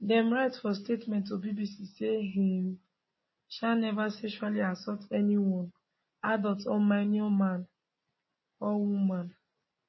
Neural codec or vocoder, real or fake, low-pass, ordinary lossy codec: none; real; 7.2 kHz; MP3, 24 kbps